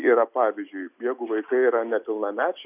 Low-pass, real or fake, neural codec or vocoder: 3.6 kHz; real; none